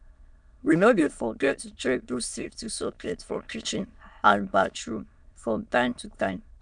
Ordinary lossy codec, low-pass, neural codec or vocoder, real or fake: none; 9.9 kHz; autoencoder, 22.05 kHz, a latent of 192 numbers a frame, VITS, trained on many speakers; fake